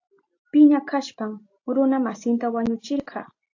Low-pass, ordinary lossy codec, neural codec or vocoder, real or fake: 7.2 kHz; AAC, 48 kbps; none; real